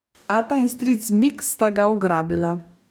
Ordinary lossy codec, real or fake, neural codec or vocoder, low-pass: none; fake; codec, 44.1 kHz, 2.6 kbps, DAC; none